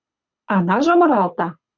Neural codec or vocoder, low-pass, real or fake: codec, 24 kHz, 6 kbps, HILCodec; 7.2 kHz; fake